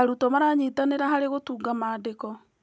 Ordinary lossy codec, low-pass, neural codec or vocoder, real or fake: none; none; none; real